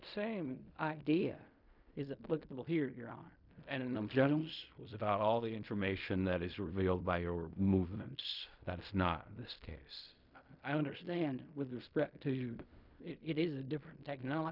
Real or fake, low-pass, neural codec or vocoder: fake; 5.4 kHz; codec, 16 kHz in and 24 kHz out, 0.4 kbps, LongCat-Audio-Codec, fine tuned four codebook decoder